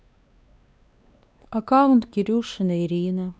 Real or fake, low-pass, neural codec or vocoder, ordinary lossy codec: fake; none; codec, 16 kHz, 2 kbps, X-Codec, WavLM features, trained on Multilingual LibriSpeech; none